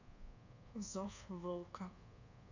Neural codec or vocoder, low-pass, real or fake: codec, 24 kHz, 1.2 kbps, DualCodec; 7.2 kHz; fake